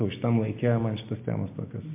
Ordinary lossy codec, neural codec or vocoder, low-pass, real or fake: MP3, 24 kbps; none; 3.6 kHz; real